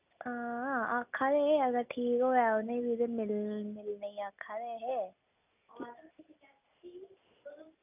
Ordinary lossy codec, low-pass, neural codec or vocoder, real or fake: none; 3.6 kHz; none; real